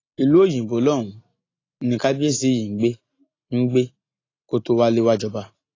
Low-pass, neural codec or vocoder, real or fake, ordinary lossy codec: 7.2 kHz; none; real; AAC, 32 kbps